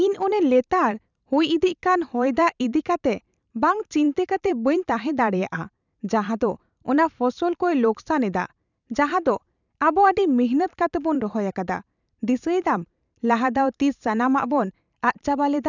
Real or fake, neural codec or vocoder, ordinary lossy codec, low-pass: fake; vocoder, 44.1 kHz, 128 mel bands every 512 samples, BigVGAN v2; none; 7.2 kHz